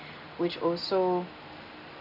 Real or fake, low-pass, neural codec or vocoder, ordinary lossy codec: real; 5.4 kHz; none; none